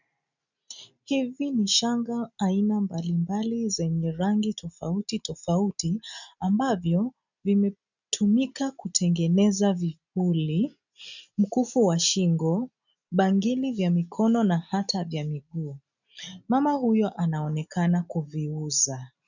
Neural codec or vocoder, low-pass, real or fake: none; 7.2 kHz; real